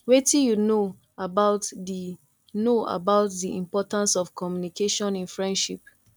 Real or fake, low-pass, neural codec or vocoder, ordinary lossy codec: real; 19.8 kHz; none; none